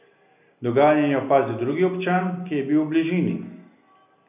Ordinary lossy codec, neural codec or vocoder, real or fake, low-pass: none; none; real; 3.6 kHz